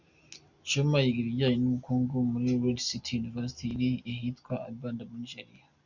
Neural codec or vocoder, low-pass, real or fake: none; 7.2 kHz; real